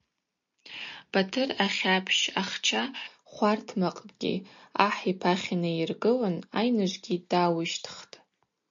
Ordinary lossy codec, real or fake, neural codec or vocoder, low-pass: MP3, 48 kbps; real; none; 7.2 kHz